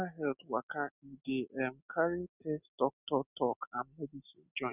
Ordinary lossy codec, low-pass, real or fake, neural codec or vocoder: none; 3.6 kHz; real; none